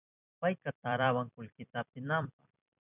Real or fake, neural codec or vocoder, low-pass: fake; vocoder, 44.1 kHz, 128 mel bands every 256 samples, BigVGAN v2; 3.6 kHz